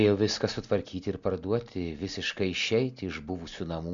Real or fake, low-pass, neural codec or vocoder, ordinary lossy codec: real; 7.2 kHz; none; MP3, 64 kbps